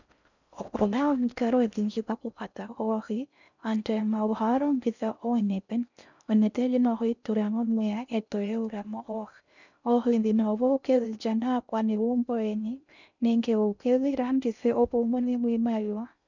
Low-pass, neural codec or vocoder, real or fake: 7.2 kHz; codec, 16 kHz in and 24 kHz out, 0.6 kbps, FocalCodec, streaming, 4096 codes; fake